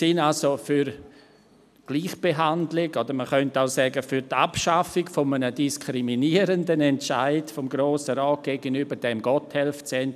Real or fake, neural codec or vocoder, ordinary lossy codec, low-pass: real; none; none; 14.4 kHz